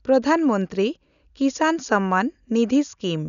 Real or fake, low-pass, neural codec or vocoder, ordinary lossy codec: real; 7.2 kHz; none; none